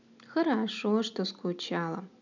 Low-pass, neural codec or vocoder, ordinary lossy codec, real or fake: 7.2 kHz; none; none; real